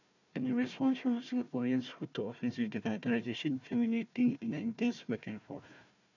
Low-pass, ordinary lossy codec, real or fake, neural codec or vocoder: 7.2 kHz; none; fake; codec, 16 kHz, 1 kbps, FunCodec, trained on Chinese and English, 50 frames a second